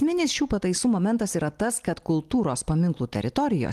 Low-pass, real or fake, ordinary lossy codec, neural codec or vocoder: 14.4 kHz; real; Opus, 24 kbps; none